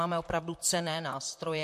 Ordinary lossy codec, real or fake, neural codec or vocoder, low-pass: MP3, 64 kbps; fake; vocoder, 44.1 kHz, 128 mel bands, Pupu-Vocoder; 14.4 kHz